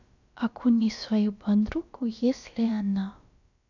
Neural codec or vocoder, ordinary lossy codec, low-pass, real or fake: codec, 16 kHz, about 1 kbps, DyCAST, with the encoder's durations; none; 7.2 kHz; fake